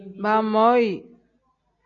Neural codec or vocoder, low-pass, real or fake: none; 7.2 kHz; real